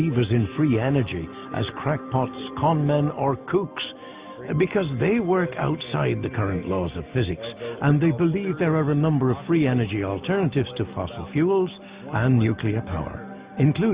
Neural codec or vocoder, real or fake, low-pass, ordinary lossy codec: none; real; 3.6 kHz; Opus, 64 kbps